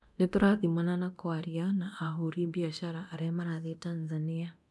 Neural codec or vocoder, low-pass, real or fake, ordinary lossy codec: codec, 24 kHz, 0.9 kbps, DualCodec; none; fake; none